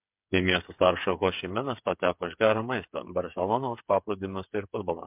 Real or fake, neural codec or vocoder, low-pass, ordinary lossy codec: fake; codec, 16 kHz, 8 kbps, FreqCodec, smaller model; 3.6 kHz; MP3, 32 kbps